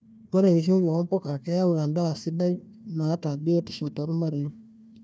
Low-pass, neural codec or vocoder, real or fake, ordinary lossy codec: none; codec, 16 kHz, 1 kbps, FunCodec, trained on Chinese and English, 50 frames a second; fake; none